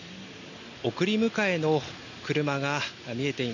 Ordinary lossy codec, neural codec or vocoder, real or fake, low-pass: none; none; real; 7.2 kHz